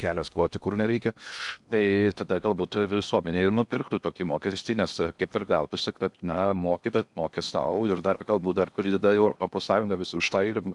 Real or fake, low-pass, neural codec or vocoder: fake; 10.8 kHz; codec, 16 kHz in and 24 kHz out, 0.8 kbps, FocalCodec, streaming, 65536 codes